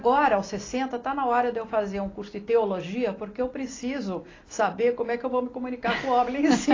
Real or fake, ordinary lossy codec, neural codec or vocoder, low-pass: real; AAC, 32 kbps; none; 7.2 kHz